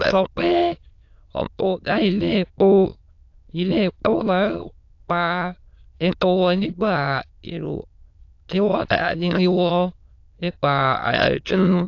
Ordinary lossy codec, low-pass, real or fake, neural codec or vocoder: AAC, 48 kbps; 7.2 kHz; fake; autoencoder, 22.05 kHz, a latent of 192 numbers a frame, VITS, trained on many speakers